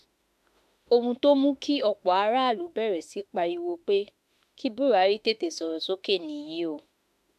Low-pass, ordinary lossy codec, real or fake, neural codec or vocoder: 14.4 kHz; MP3, 96 kbps; fake; autoencoder, 48 kHz, 32 numbers a frame, DAC-VAE, trained on Japanese speech